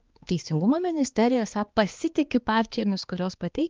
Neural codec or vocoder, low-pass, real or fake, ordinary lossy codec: codec, 16 kHz, 2 kbps, X-Codec, HuBERT features, trained on balanced general audio; 7.2 kHz; fake; Opus, 32 kbps